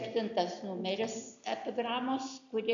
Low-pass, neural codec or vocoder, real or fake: 7.2 kHz; none; real